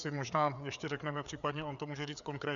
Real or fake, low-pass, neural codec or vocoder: fake; 7.2 kHz; codec, 16 kHz, 4 kbps, FreqCodec, larger model